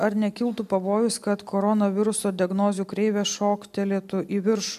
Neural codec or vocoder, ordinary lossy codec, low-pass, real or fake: none; AAC, 96 kbps; 14.4 kHz; real